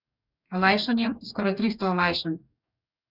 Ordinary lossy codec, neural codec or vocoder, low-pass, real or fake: none; codec, 44.1 kHz, 2.6 kbps, DAC; 5.4 kHz; fake